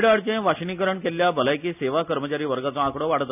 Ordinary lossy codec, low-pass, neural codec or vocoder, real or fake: none; 3.6 kHz; none; real